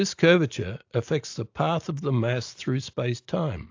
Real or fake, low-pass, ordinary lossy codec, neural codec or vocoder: real; 7.2 kHz; AAC, 48 kbps; none